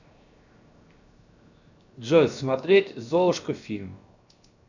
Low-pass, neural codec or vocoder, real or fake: 7.2 kHz; codec, 16 kHz, 0.7 kbps, FocalCodec; fake